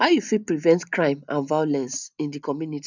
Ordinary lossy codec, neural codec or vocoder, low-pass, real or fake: none; none; 7.2 kHz; real